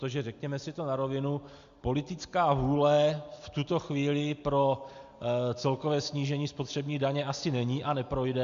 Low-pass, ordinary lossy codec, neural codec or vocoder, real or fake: 7.2 kHz; AAC, 64 kbps; none; real